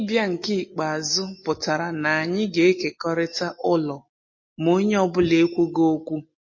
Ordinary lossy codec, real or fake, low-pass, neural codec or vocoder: MP3, 32 kbps; real; 7.2 kHz; none